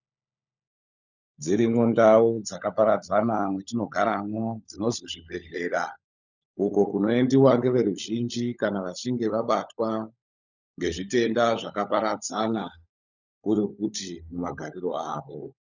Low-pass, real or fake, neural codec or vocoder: 7.2 kHz; fake; codec, 16 kHz, 16 kbps, FunCodec, trained on LibriTTS, 50 frames a second